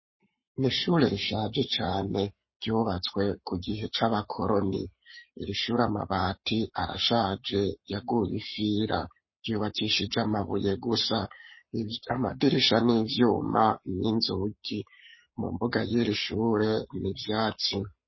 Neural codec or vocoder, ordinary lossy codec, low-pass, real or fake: codec, 44.1 kHz, 7.8 kbps, Pupu-Codec; MP3, 24 kbps; 7.2 kHz; fake